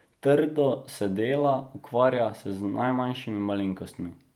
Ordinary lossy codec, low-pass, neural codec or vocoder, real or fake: Opus, 32 kbps; 19.8 kHz; vocoder, 48 kHz, 128 mel bands, Vocos; fake